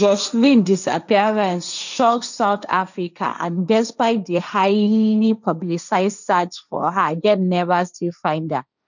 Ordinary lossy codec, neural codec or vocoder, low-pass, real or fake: none; codec, 16 kHz, 1.1 kbps, Voila-Tokenizer; 7.2 kHz; fake